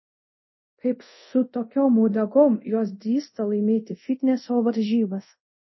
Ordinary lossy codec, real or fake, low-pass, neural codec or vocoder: MP3, 24 kbps; fake; 7.2 kHz; codec, 24 kHz, 0.5 kbps, DualCodec